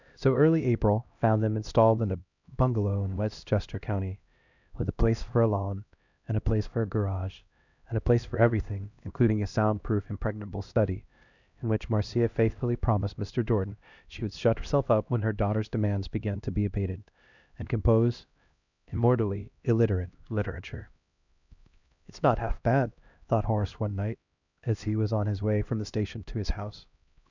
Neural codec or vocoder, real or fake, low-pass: codec, 16 kHz, 1 kbps, X-Codec, HuBERT features, trained on LibriSpeech; fake; 7.2 kHz